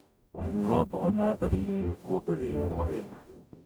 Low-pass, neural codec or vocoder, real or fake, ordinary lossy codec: none; codec, 44.1 kHz, 0.9 kbps, DAC; fake; none